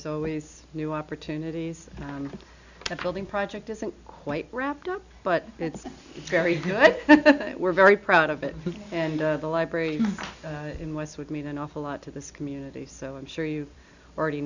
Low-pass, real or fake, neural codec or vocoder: 7.2 kHz; real; none